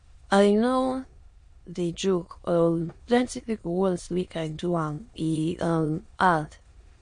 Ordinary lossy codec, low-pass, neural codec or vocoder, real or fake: MP3, 48 kbps; 9.9 kHz; autoencoder, 22.05 kHz, a latent of 192 numbers a frame, VITS, trained on many speakers; fake